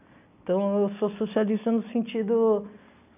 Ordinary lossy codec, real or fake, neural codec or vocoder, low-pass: none; real; none; 3.6 kHz